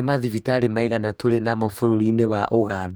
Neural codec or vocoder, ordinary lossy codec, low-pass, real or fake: codec, 44.1 kHz, 2.6 kbps, DAC; none; none; fake